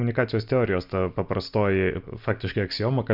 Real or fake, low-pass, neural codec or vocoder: real; 5.4 kHz; none